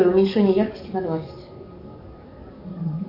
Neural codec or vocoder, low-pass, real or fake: codec, 44.1 kHz, 7.8 kbps, DAC; 5.4 kHz; fake